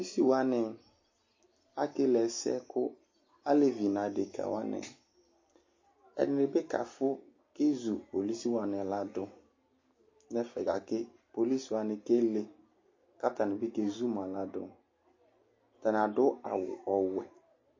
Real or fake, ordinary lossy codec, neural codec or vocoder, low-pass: real; MP3, 32 kbps; none; 7.2 kHz